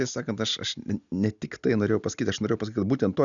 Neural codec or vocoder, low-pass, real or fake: none; 7.2 kHz; real